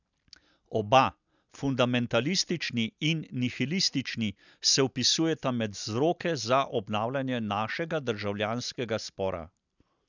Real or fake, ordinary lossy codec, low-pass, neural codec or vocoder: real; none; 7.2 kHz; none